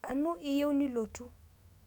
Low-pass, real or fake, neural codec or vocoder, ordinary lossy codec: 19.8 kHz; fake; autoencoder, 48 kHz, 128 numbers a frame, DAC-VAE, trained on Japanese speech; none